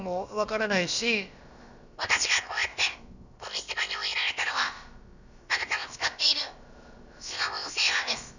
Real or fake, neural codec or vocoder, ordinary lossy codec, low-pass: fake; codec, 16 kHz, about 1 kbps, DyCAST, with the encoder's durations; none; 7.2 kHz